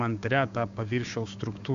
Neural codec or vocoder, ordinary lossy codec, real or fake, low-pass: codec, 16 kHz, 6 kbps, DAC; AAC, 96 kbps; fake; 7.2 kHz